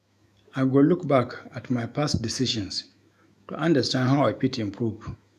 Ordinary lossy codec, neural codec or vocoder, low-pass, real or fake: none; autoencoder, 48 kHz, 128 numbers a frame, DAC-VAE, trained on Japanese speech; 14.4 kHz; fake